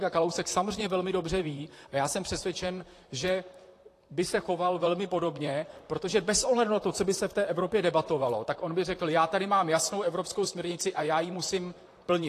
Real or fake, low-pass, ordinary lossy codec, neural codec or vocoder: fake; 14.4 kHz; AAC, 48 kbps; vocoder, 44.1 kHz, 128 mel bands, Pupu-Vocoder